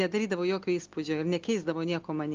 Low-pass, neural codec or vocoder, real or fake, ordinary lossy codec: 7.2 kHz; none; real; Opus, 24 kbps